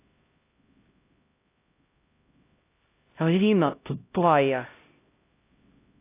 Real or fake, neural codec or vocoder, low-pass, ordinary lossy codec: fake; codec, 16 kHz, 0.5 kbps, X-Codec, HuBERT features, trained on LibriSpeech; 3.6 kHz; AAC, 24 kbps